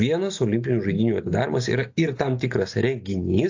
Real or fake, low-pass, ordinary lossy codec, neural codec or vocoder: real; 7.2 kHz; AAC, 48 kbps; none